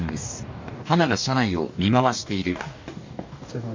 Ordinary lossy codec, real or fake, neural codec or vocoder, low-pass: MP3, 48 kbps; fake; codec, 44.1 kHz, 2.6 kbps, DAC; 7.2 kHz